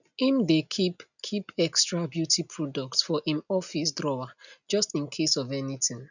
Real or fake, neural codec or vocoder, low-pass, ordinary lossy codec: real; none; 7.2 kHz; none